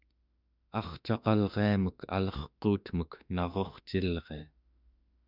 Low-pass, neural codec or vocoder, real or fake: 5.4 kHz; autoencoder, 48 kHz, 32 numbers a frame, DAC-VAE, trained on Japanese speech; fake